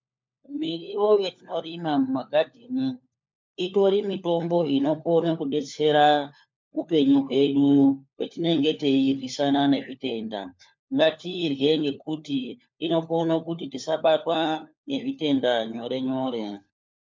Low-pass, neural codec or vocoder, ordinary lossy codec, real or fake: 7.2 kHz; codec, 16 kHz, 4 kbps, FunCodec, trained on LibriTTS, 50 frames a second; MP3, 64 kbps; fake